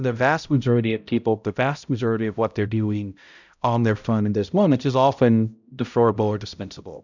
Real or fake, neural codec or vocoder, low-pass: fake; codec, 16 kHz, 0.5 kbps, X-Codec, HuBERT features, trained on balanced general audio; 7.2 kHz